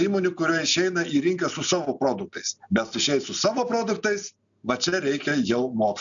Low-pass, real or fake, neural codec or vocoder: 7.2 kHz; real; none